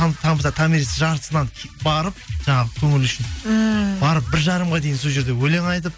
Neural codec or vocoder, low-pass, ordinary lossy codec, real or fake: none; none; none; real